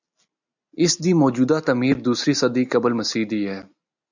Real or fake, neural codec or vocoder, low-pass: real; none; 7.2 kHz